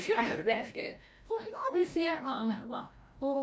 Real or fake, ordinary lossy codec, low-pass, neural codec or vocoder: fake; none; none; codec, 16 kHz, 0.5 kbps, FreqCodec, larger model